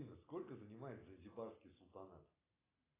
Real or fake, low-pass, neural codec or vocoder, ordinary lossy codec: real; 3.6 kHz; none; AAC, 16 kbps